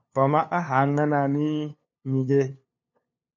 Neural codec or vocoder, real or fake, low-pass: codec, 16 kHz, 2 kbps, FunCodec, trained on LibriTTS, 25 frames a second; fake; 7.2 kHz